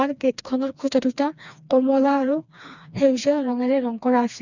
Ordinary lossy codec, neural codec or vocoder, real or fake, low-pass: none; codec, 16 kHz, 2 kbps, FreqCodec, smaller model; fake; 7.2 kHz